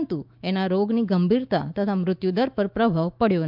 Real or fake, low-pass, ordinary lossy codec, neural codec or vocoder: fake; 5.4 kHz; Opus, 24 kbps; autoencoder, 48 kHz, 128 numbers a frame, DAC-VAE, trained on Japanese speech